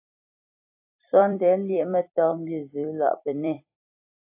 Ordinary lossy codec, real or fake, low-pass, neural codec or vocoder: AAC, 32 kbps; fake; 3.6 kHz; vocoder, 44.1 kHz, 128 mel bands every 256 samples, BigVGAN v2